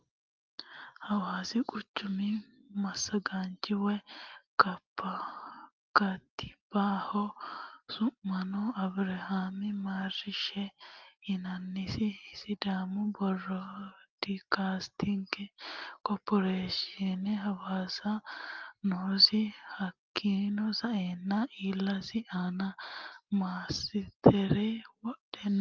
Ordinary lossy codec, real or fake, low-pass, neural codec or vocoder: Opus, 32 kbps; real; 7.2 kHz; none